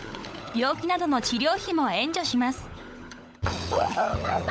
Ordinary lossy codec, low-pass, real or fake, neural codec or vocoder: none; none; fake; codec, 16 kHz, 16 kbps, FunCodec, trained on LibriTTS, 50 frames a second